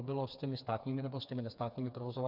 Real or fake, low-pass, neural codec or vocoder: fake; 5.4 kHz; codec, 16 kHz, 4 kbps, FreqCodec, smaller model